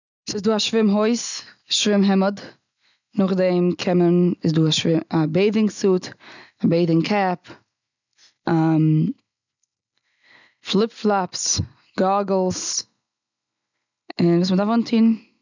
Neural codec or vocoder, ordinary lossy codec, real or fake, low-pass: none; none; real; 7.2 kHz